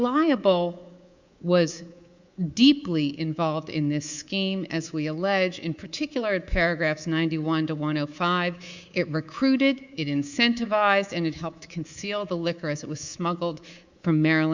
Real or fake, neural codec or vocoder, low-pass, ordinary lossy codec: fake; codec, 24 kHz, 3.1 kbps, DualCodec; 7.2 kHz; Opus, 64 kbps